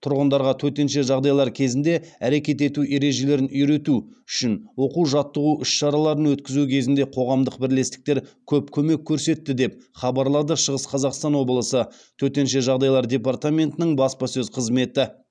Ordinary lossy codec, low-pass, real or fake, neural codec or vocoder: none; 9.9 kHz; real; none